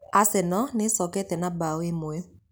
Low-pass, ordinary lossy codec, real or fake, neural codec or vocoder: none; none; real; none